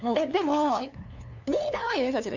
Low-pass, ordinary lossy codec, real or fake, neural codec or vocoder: 7.2 kHz; none; fake; codec, 16 kHz, 2 kbps, FunCodec, trained on LibriTTS, 25 frames a second